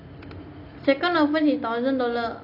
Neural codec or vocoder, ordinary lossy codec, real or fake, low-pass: none; none; real; 5.4 kHz